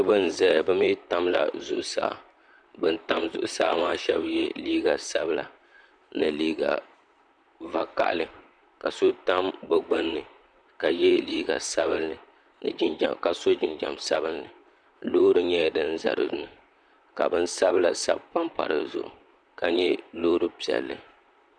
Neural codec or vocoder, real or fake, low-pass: vocoder, 44.1 kHz, 128 mel bands, Pupu-Vocoder; fake; 9.9 kHz